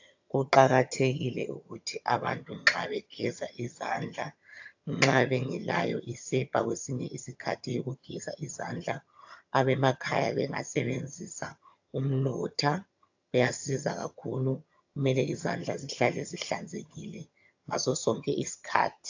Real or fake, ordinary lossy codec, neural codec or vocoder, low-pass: fake; AAC, 48 kbps; vocoder, 22.05 kHz, 80 mel bands, HiFi-GAN; 7.2 kHz